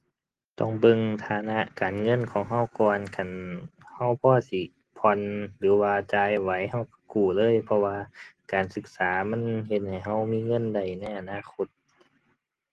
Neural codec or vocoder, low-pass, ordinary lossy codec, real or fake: none; 10.8 kHz; Opus, 16 kbps; real